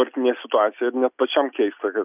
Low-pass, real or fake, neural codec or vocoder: 3.6 kHz; real; none